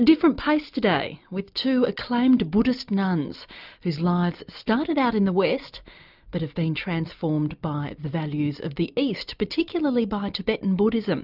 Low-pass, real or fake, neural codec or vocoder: 5.4 kHz; real; none